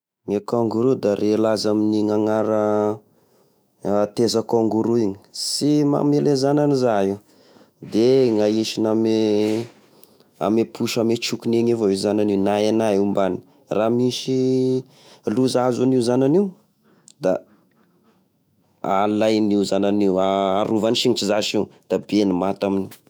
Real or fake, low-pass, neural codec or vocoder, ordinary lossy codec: fake; none; autoencoder, 48 kHz, 128 numbers a frame, DAC-VAE, trained on Japanese speech; none